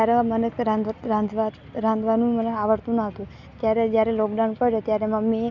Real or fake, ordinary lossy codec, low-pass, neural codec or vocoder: real; none; 7.2 kHz; none